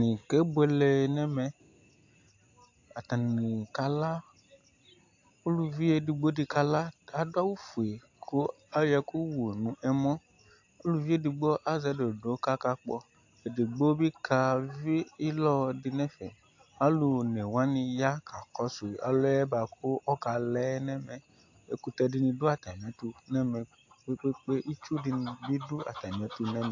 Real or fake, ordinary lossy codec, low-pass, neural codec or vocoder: real; AAC, 48 kbps; 7.2 kHz; none